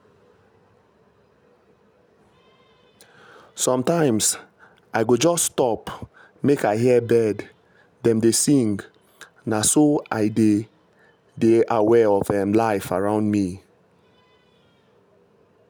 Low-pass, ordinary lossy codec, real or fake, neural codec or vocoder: none; none; real; none